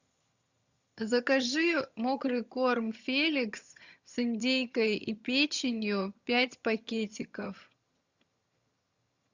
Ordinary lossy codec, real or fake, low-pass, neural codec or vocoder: Opus, 64 kbps; fake; 7.2 kHz; vocoder, 22.05 kHz, 80 mel bands, HiFi-GAN